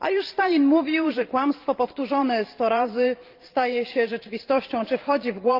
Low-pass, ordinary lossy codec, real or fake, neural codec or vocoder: 5.4 kHz; Opus, 32 kbps; fake; vocoder, 44.1 kHz, 128 mel bands every 512 samples, BigVGAN v2